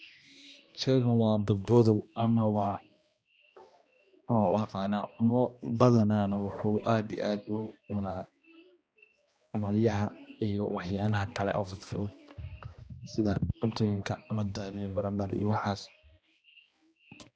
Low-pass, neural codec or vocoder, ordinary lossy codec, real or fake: none; codec, 16 kHz, 1 kbps, X-Codec, HuBERT features, trained on balanced general audio; none; fake